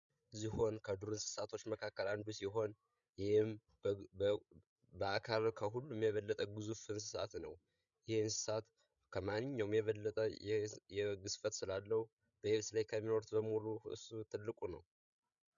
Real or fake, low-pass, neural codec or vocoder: fake; 7.2 kHz; codec, 16 kHz, 16 kbps, FreqCodec, larger model